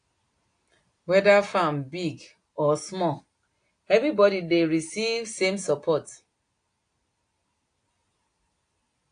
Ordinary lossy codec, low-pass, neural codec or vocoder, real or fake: AAC, 48 kbps; 9.9 kHz; none; real